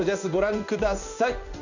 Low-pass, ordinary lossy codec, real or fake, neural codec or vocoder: 7.2 kHz; none; fake; codec, 16 kHz in and 24 kHz out, 1 kbps, XY-Tokenizer